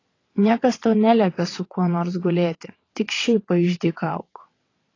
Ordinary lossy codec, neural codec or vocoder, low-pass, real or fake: AAC, 32 kbps; vocoder, 22.05 kHz, 80 mel bands, WaveNeXt; 7.2 kHz; fake